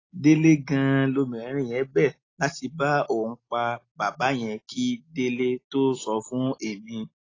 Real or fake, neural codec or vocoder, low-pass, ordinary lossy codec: real; none; 7.2 kHz; AAC, 32 kbps